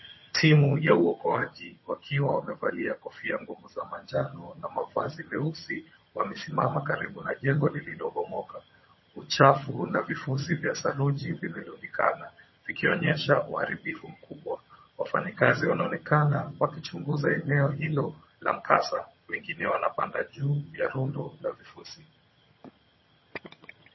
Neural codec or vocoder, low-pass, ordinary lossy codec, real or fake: vocoder, 22.05 kHz, 80 mel bands, HiFi-GAN; 7.2 kHz; MP3, 24 kbps; fake